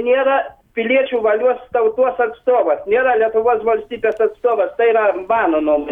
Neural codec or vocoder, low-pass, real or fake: none; 19.8 kHz; real